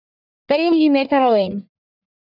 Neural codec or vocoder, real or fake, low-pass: codec, 44.1 kHz, 1.7 kbps, Pupu-Codec; fake; 5.4 kHz